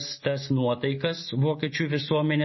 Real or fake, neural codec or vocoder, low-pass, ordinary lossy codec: real; none; 7.2 kHz; MP3, 24 kbps